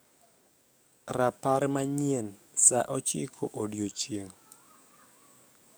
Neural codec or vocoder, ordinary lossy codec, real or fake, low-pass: codec, 44.1 kHz, 7.8 kbps, DAC; none; fake; none